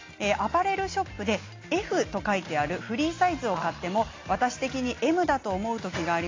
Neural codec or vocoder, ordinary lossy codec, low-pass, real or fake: none; AAC, 32 kbps; 7.2 kHz; real